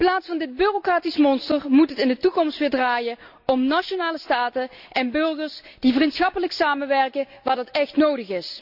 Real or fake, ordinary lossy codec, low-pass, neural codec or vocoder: real; AAC, 48 kbps; 5.4 kHz; none